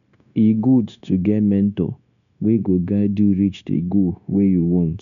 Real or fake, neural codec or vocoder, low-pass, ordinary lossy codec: fake; codec, 16 kHz, 0.9 kbps, LongCat-Audio-Codec; 7.2 kHz; none